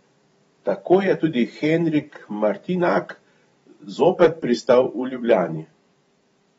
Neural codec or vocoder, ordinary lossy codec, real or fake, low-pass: vocoder, 44.1 kHz, 128 mel bands every 512 samples, BigVGAN v2; AAC, 24 kbps; fake; 19.8 kHz